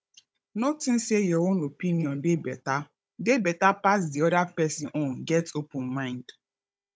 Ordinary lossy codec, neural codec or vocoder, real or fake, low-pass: none; codec, 16 kHz, 16 kbps, FunCodec, trained on Chinese and English, 50 frames a second; fake; none